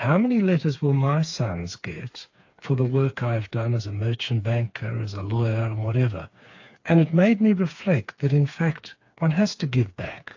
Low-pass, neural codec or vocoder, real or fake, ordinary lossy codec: 7.2 kHz; codec, 16 kHz, 4 kbps, FreqCodec, smaller model; fake; AAC, 48 kbps